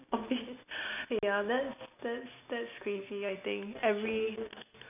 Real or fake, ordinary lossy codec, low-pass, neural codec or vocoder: real; none; 3.6 kHz; none